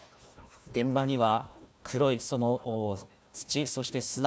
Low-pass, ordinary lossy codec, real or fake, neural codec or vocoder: none; none; fake; codec, 16 kHz, 1 kbps, FunCodec, trained on Chinese and English, 50 frames a second